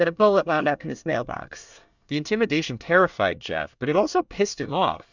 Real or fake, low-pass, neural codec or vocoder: fake; 7.2 kHz; codec, 24 kHz, 1 kbps, SNAC